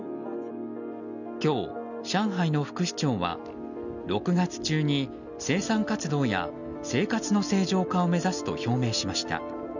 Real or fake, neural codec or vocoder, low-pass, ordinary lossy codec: real; none; 7.2 kHz; none